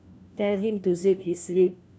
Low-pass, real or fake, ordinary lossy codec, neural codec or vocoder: none; fake; none; codec, 16 kHz, 1 kbps, FunCodec, trained on LibriTTS, 50 frames a second